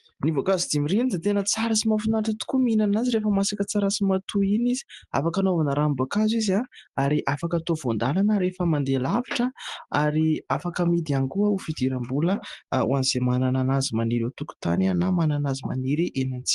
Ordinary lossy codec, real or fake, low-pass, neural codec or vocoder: Opus, 24 kbps; real; 10.8 kHz; none